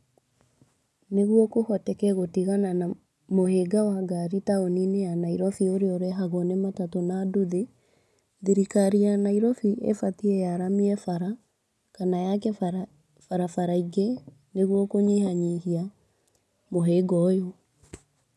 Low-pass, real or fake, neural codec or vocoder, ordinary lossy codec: none; real; none; none